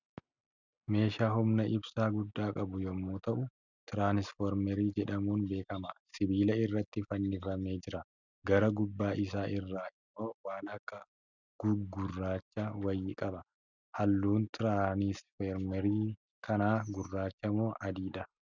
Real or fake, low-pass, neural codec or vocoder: real; 7.2 kHz; none